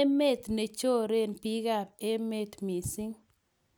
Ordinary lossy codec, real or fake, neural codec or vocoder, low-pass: none; real; none; none